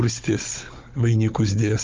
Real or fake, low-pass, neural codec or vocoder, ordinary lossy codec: fake; 7.2 kHz; codec, 16 kHz, 16 kbps, FunCodec, trained on Chinese and English, 50 frames a second; Opus, 32 kbps